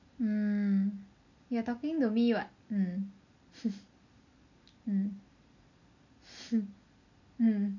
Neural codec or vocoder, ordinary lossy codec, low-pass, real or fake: none; none; 7.2 kHz; real